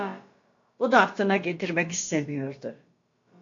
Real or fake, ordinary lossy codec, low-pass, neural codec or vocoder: fake; AAC, 48 kbps; 7.2 kHz; codec, 16 kHz, about 1 kbps, DyCAST, with the encoder's durations